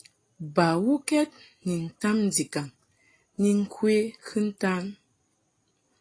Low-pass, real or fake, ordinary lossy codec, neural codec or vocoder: 9.9 kHz; real; AAC, 32 kbps; none